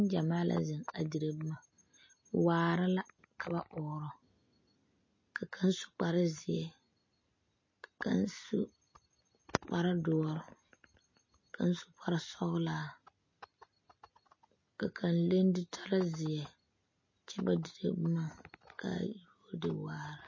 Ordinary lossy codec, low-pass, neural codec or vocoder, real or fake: MP3, 32 kbps; 7.2 kHz; none; real